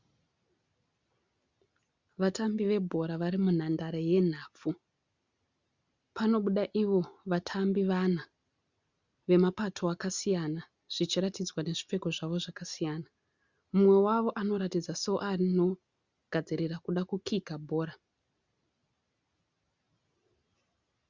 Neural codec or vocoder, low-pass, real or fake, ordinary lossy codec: none; 7.2 kHz; real; Opus, 64 kbps